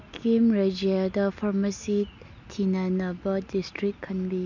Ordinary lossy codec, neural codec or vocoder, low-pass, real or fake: none; none; 7.2 kHz; real